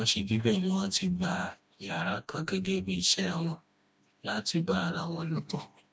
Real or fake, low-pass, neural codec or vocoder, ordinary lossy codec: fake; none; codec, 16 kHz, 1 kbps, FreqCodec, smaller model; none